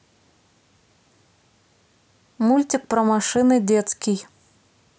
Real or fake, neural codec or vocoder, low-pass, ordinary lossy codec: real; none; none; none